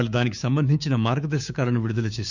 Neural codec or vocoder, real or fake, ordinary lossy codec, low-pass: codec, 16 kHz, 6 kbps, DAC; fake; none; 7.2 kHz